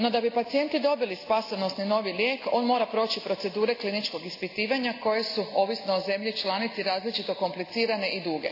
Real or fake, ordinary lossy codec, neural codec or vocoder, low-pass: real; AAC, 32 kbps; none; 5.4 kHz